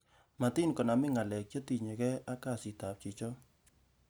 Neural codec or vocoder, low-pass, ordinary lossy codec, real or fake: none; none; none; real